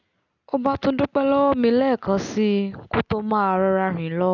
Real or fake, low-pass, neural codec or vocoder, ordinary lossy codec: real; 7.2 kHz; none; none